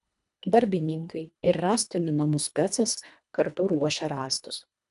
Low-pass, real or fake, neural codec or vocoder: 10.8 kHz; fake; codec, 24 kHz, 1.5 kbps, HILCodec